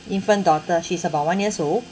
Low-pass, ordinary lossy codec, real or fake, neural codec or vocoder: none; none; real; none